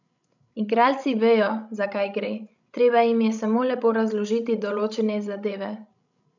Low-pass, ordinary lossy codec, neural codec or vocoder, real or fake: 7.2 kHz; none; codec, 16 kHz, 16 kbps, FreqCodec, larger model; fake